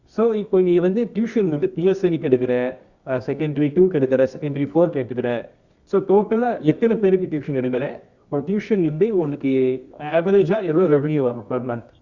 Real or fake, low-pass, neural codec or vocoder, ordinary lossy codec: fake; 7.2 kHz; codec, 24 kHz, 0.9 kbps, WavTokenizer, medium music audio release; Opus, 64 kbps